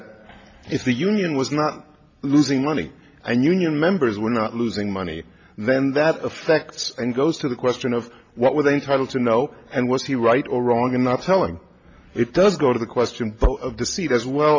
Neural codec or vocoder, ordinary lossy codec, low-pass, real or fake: none; MP3, 48 kbps; 7.2 kHz; real